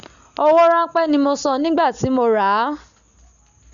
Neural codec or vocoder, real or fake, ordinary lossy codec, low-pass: none; real; none; 7.2 kHz